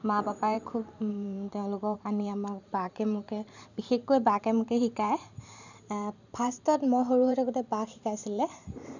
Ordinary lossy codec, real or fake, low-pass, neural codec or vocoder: none; fake; 7.2 kHz; vocoder, 44.1 kHz, 128 mel bands every 512 samples, BigVGAN v2